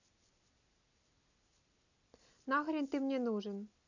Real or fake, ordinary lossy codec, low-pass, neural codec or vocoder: real; none; 7.2 kHz; none